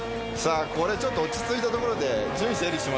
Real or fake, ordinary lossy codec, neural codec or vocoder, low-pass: real; none; none; none